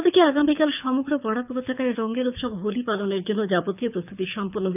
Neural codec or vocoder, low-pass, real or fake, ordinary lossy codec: codec, 24 kHz, 6 kbps, HILCodec; 3.6 kHz; fake; none